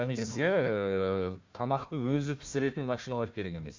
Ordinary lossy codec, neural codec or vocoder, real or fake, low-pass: MP3, 64 kbps; codec, 16 kHz, 1 kbps, FunCodec, trained on Chinese and English, 50 frames a second; fake; 7.2 kHz